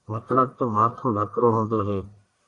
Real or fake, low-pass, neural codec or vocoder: fake; 10.8 kHz; codec, 44.1 kHz, 1.7 kbps, Pupu-Codec